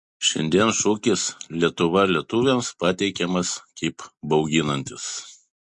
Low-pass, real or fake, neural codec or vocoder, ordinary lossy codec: 10.8 kHz; real; none; MP3, 48 kbps